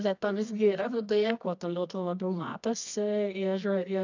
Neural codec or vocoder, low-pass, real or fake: codec, 24 kHz, 0.9 kbps, WavTokenizer, medium music audio release; 7.2 kHz; fake